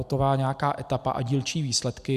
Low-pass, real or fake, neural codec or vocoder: 14.4 kHz; real; none